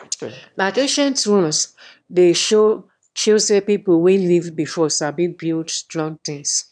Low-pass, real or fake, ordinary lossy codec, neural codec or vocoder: 9.9 kHz; fake; none; autoencoder, 22.05 kHz, a latent of 192 numbers a frame, VITS, trained on one speaker